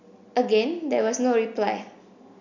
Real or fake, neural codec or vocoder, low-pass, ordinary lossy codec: real; none; 7.2 kHz; none